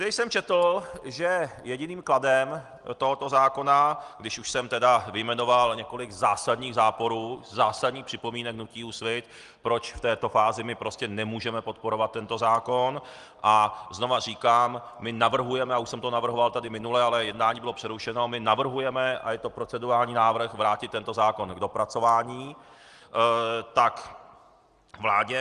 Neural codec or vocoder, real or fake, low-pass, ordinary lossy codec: none; real; 10.8 kHz; Opus, 24 kbps